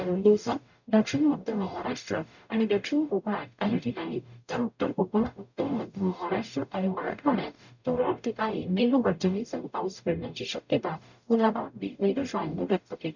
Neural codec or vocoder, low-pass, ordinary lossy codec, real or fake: codec, 44.1 kHz, 0.9 kbps, DAC; 7.2 kHz; none; fake